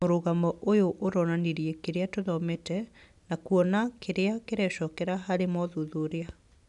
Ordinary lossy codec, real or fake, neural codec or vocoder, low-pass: none; real; none; 10.8 kHz